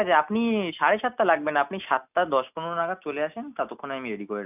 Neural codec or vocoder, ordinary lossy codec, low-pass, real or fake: none; none; 3.6 kHz; real